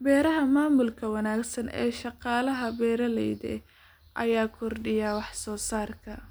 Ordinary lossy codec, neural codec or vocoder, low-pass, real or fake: none; none; none; real